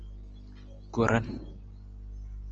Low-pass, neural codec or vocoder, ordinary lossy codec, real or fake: 7.2 kHz; none; Opus, 24 kbps; real